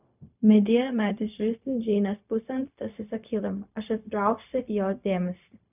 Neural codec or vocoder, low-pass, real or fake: codec, 16 kHz, 0.4 kbps, LongCat-Audio-Codec; 3.6 kHz; fake